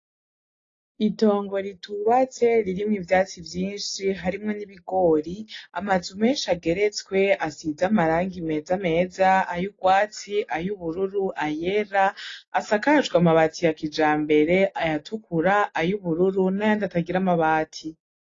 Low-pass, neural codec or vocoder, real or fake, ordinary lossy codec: 7.2 kHz; none; real; AAC, 32 kbps